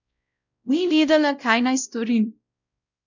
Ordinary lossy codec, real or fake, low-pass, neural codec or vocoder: none; fake; 7.2 kHz; codec, 16 kHz, 0.5 kbps, X-Codec, WavLM features, trained on Multilingual LibriSpeech